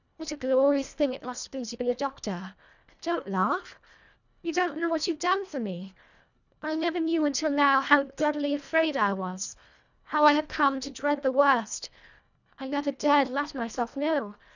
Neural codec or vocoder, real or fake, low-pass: codec, 24 kHz, 1.5 kbps, HILCodec; fake; 7.2 kHz